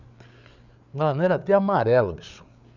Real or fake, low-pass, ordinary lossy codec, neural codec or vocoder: fake; 7.2 kHz; none; codec, 16 kHz, 8 kbps, FreqCodec, larger model